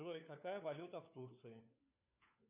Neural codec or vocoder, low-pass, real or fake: codec, 16 kHz, 4 kbps, FunCodec, trained on LibriTTS, 50 frames a second; 3.6 kHz; fake